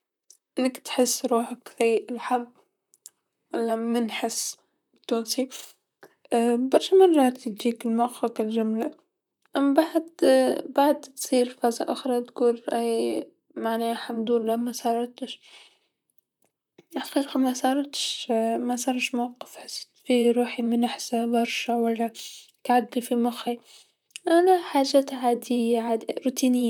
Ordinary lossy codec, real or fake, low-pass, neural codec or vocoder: none; fake; 19.8 kHz; vocoder, 44.1 kHz, 128 mel bands, Pupu-Vocoder